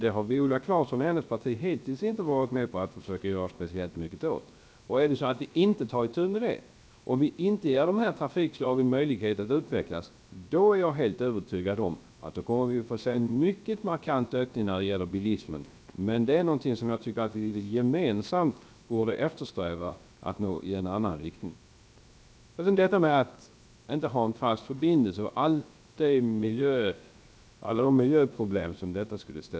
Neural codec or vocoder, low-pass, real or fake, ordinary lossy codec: codec, 16 kHz, 0.7 kbps, FocalCodec; none; fake; none